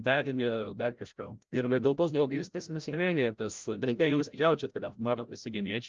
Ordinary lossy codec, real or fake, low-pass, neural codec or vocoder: Opus, 24 kbps; fake; 7.2 kHz; codec, 16 kHz, 0.5 kbps, FreqCodec, larger model